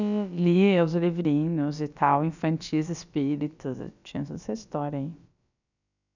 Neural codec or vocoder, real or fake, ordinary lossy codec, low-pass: codec, 16 kHz, about 1 kbps, DyCAST, with the encoder's durations; fake; none; 7.2 kHz